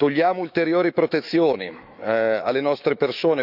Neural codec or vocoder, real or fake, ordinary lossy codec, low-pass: autoencoder, 48 kHz, 128 numbers a frame, DAC-VAE, trained on Japanese speech; fake; none; 5.4 kHz